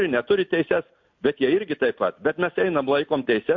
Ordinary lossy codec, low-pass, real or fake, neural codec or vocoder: MP3, 48 kbps; 7.2 kHz; real; none